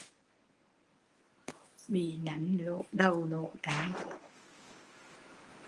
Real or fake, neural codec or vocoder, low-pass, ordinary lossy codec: fake; codec, 24 kHz, 0.9 kbps, WavTokenizer, medium speech release version 1; 10.8 kHz; Opus, 24 kbps